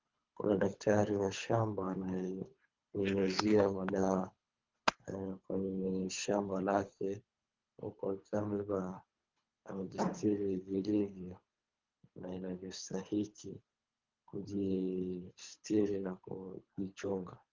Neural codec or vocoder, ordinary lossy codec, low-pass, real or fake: codec, 24 kHz, 3 kbps, HILCodec; Opus, 32 kbps; 7.2 kHz; fake